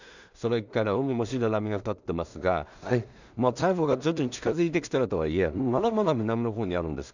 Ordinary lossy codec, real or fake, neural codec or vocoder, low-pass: none; fake; codec, 16 kHz in and 24 kHz out, 0.4 kbps, LongCat-Audio-Codec, two codebook decoder; 7.2 kHz